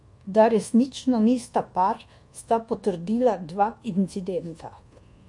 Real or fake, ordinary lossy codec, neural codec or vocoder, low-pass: fake; MP3, 48 kbps; codec, 24 kHz, 1.2 kbps, DualCodec; 10.8 kHz